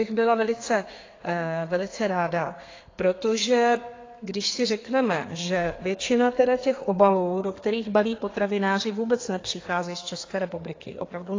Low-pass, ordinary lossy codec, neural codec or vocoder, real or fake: 7.2 kHz; AAC, 32 kbps; codec, 32 kHz, 1.9 kbps, SNAC; fake